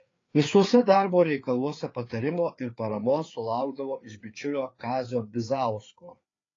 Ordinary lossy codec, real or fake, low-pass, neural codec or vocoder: AAC, 32 kbps; fake; 7.2 kHz; codec, 16 kHz, 4 kbps, FreqCodec, larger model